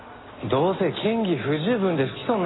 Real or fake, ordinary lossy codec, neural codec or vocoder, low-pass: real; AAC, 16 kbps; none; 7.2 kHz